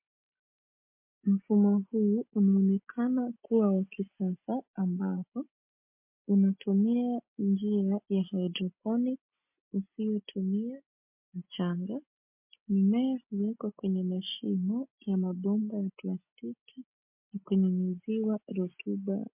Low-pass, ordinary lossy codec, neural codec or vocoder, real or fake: 3.6 kHz; MP3, 32 kbps; none; real